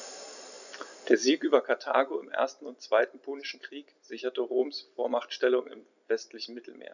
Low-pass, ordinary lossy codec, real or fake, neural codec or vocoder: 7.2 kHz; none; real; none